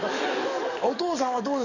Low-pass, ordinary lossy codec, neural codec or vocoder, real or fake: 7.2 kHz; none; codec, 44.1 kHz, 7.8 kbps, DAC; fake